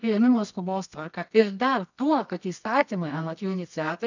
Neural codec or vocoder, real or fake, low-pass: codec, 24 kHz, 0.9 kbps, WavTokenizer, medium music audio release; fake; 7.2 kHz